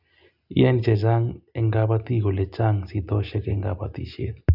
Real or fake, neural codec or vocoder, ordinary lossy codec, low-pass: real; none; none; 5.4 kHz